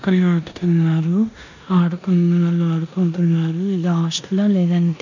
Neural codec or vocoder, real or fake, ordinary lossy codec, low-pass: codec, 16 kHz in and 24 kHz out, 0.9 kbps, LongCat-Audio-Codec, four codebook decoder; fake; none; 7.2 kHz